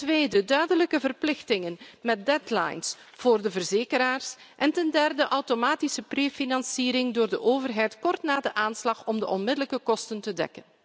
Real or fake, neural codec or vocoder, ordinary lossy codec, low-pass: real; none; none; none